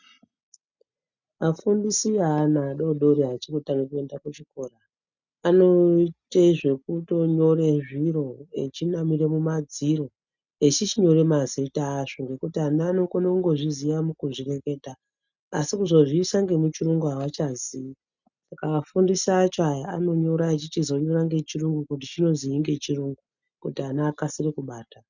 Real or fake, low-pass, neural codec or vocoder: real; 7.2 kHz; none